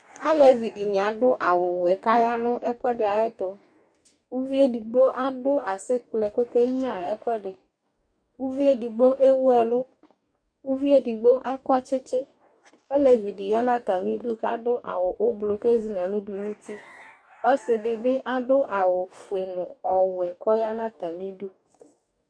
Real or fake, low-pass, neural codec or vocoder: fake; 9.9 kHz; codec, 44.1 kHz, 2.6 kbps, DAC